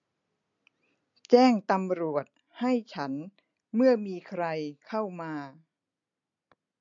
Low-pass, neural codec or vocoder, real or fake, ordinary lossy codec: 7.2 kHz; none; real; MP3, 48 kbps